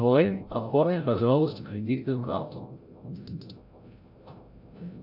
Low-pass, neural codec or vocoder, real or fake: 5.4 kHz; codec, 16 kHz, 0.5 kbps, FreqCodec, larger model; fake